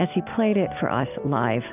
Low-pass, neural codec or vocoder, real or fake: 3.6 kHz; none; real